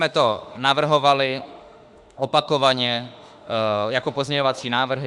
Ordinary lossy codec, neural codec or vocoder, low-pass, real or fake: Opus, 64 kbps; autoencoder, 48 kHz, 32 numbers a frame, DAC-VAE, trained on Japanese speech; 10.8 kHz; fake